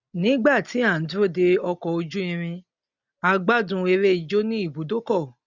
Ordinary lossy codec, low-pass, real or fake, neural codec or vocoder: none; 7.2 kHz; real; none